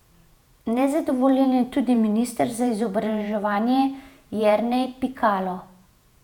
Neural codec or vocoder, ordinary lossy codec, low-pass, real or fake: vocoder, 48 kHz, 128 mel bands, Vocos; none; 19.8 kHz; fake